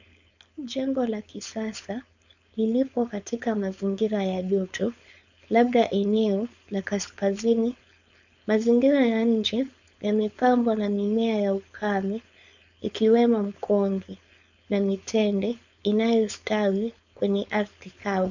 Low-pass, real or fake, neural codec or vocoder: 7.2 kHz; fake; codec, 16 kHz, 4.8 kbps, FACodec